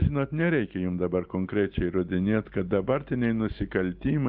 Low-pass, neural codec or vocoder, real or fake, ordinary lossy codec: 5.4 kHz; none; real; Opus, 24 kbps